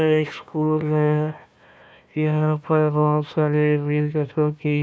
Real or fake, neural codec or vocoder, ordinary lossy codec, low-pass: fake; codec, 16 kHz, 1 kbps, FunCodec, trained on Chinese and English, 50 frames a second; none; none